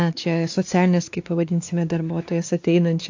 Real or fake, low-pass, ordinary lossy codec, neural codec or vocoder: fake; 7.2 kHz; AAC, 48 kbps; codec, 16 kHz, 2 kbps, X-Codec, WavLM features, trained on Multilingual LibriSpeech